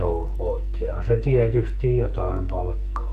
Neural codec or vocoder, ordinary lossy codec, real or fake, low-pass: codec, 44.1 kHz, 2.6 kbps, SNAC; none; fake; 14.4 kHz